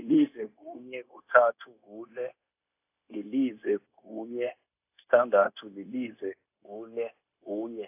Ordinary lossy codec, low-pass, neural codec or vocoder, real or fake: AAC, 32 kbps; 3.6 kHz; codec, 16 kHz, 0.9 kbps, LongCat-Audio-Codec; fake